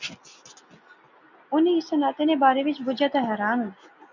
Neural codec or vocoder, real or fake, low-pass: none; real; 7.2 kHz